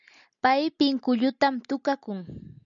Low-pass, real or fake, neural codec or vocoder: 7.2 kHz; real; none